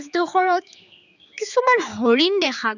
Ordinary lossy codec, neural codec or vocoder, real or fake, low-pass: none; codec, 16 kHz, 4 kbps, X-Codec, HuBERT features, trained on general audio; fake; 7.2 kHz